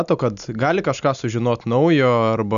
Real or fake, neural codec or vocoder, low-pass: real; none; 7.2 kHz